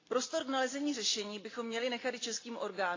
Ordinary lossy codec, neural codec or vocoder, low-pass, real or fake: AAC, 32 kbps; none; 7.2 kHz; real